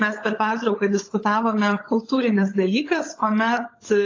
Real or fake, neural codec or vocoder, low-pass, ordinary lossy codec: fake; codec, 16 kHz, 16 kbps, FunCodec, trained on LibriTTS, 50 frames a second; 7.2 kHz; AAC, 32 kbps